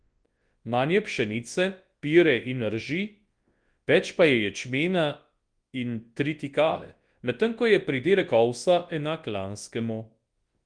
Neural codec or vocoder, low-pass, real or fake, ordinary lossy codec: codec, 24 kHz, 0.9 kbps, WavTokenizer, large speech release; 9.9 kHz; fake; Opus, 24 kbps